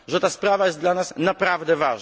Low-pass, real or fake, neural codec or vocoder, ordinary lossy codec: none; real; none; none